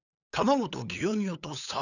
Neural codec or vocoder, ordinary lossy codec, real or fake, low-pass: codec, 16 kHz, 8 kbps, FunCodec, trained on LibriTTS, 25 frames a second; none; fake; 7.2 kHz